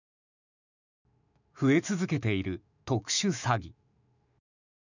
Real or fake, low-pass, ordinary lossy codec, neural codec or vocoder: fake; 7.2 kHz; none; autoencoder, 48 kHz, 128 numbers a frame, DAC-VAE, trained on Japanese speech